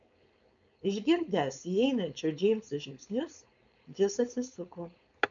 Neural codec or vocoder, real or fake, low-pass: codec, 16 kHz, 4.8 kbps, FACodec; fake; 7.2 kHz